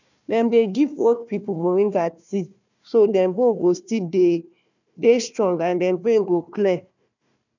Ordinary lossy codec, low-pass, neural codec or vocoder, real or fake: none; 7.2 kHz; codec, 16 kHz, 1 kbps, FunCodec, trained on Chinese and English, 50 frames a second; fake